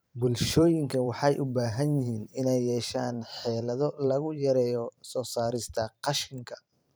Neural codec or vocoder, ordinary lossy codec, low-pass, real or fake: vocoder, 44.1 kHz, 128 mel bands every 512 samples, BigVGAN v2; none; none; fake